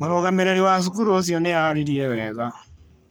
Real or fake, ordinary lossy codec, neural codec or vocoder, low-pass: fake; none; codec, 44.1 kHz, 3.4 kbps, Pupu-Codec; none